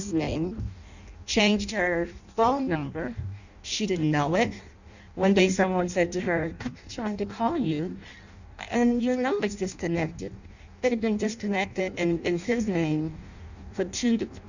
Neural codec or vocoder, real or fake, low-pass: codec, 16 kHz in and 24 kHz out, 0.6 kbps, FireRedTTS-2 codec; fake; 7.2 kHz